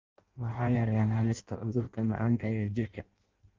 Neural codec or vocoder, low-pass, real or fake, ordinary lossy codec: codec, 16 kHz in and 24 kHz out, 0.6 kbps, FireRedTTS-2 codec; 7.2 kHz; fake; Opus, 32 kbps